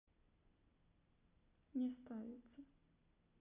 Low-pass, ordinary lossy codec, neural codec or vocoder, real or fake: 3.6 kHz; none; none; real